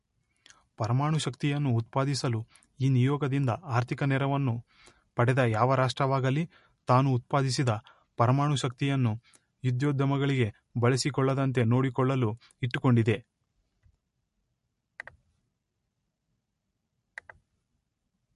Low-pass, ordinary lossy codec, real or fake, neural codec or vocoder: 14.4 kHz; MP3, 48 kbps; real; none